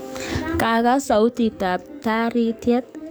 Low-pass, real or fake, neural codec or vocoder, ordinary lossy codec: none; fake; codec, 44.1 kHz, 7.8 kbps, DAC; none